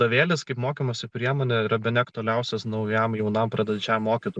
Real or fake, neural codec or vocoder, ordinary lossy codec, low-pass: real; none; MP3, 96 kbps; 9.9 kHz